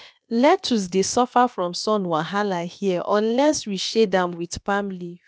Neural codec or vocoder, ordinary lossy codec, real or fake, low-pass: codec, 16 kHz, about 1 kbps, DyCAST, with the encoder's durations; none; fake; none